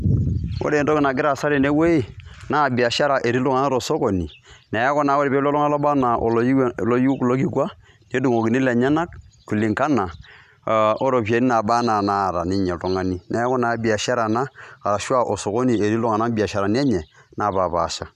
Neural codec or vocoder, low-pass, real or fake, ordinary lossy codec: none; 14.4 kHz; real; none